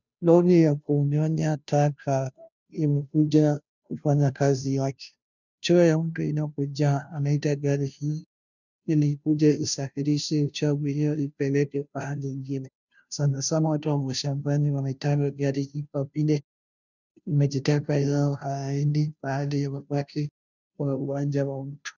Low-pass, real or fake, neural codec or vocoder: 7.2 kHz; fake; codec, 16 kHz, 0.5 kbps, FunCodec, trained on Chinese and English, 25 frames a second